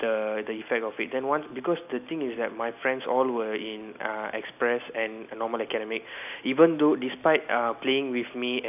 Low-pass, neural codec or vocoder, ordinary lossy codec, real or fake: 3.6 kHz; none; none; real